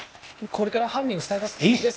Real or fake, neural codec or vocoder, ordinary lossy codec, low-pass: fake; codec, 16 kHz, 0.8 kbps, ZipCodec; none; none